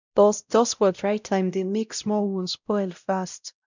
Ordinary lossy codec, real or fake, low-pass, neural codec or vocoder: none; fake; 7.2 kHz; codec, 16 kHz, 0.5 kbps, X-Codec, WavLM features, trained on Multilingual LibriSpeech